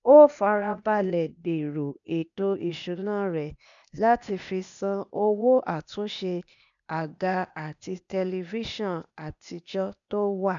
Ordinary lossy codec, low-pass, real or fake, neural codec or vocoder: none; 7.2 kHz; fake; codec, 16 kHz, 0.8 kbps, ZipCodec